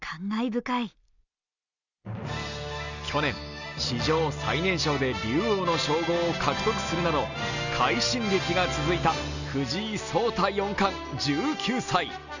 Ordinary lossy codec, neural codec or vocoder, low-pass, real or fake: none; none; 7.2 kHz; real